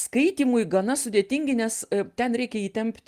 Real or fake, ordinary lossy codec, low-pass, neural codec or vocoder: real; Opus, 32 kbps; 14.4 kHz; none